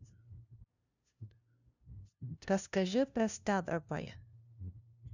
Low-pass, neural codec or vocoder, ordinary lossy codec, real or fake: 7.2 kHz; codec, 16 kHz, 0.5 kbps, FunCodec, trained on LibriTTS, 25 frames a second; none; fake